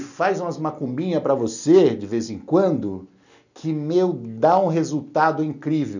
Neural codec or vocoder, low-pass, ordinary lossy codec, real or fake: none; 7.2 kHz; none; real